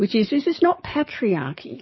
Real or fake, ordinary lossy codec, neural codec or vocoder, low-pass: fake; MP3, 24 kbps; codec, 44.1 kHz, 7.8 kbps, DAC; 7.2 kHz